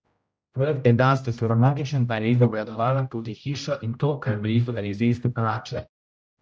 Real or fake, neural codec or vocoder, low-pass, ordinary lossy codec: fake; codec, 16 kHz, 0.5 kbps, X-Codec, HuBERT features, trained on general audio; none; none